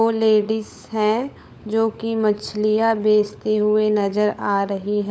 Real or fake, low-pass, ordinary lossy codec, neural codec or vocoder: fake; none; none; codec, 16 kHz, 16 kbps, FunCodec, trained on LibriTTS, 50 frames a second